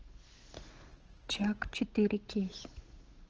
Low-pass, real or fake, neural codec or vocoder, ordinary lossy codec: 7.2 kHz; fake; codec, 16 kHz, 8 kbps, FunCodec, trained on Chinese and English, 25 frames a second; Opus, 16 kbps